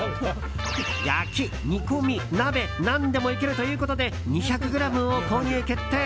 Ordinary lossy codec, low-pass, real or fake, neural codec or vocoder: none; none; real; none